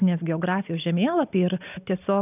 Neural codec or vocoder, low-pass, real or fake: none; 3.6 kHz; real